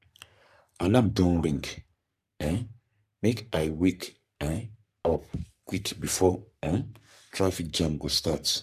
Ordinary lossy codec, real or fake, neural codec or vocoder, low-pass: none; fake; codec, 44.1 kHz, 3.4 kbps, Pupu-Codec; 14.4 kHz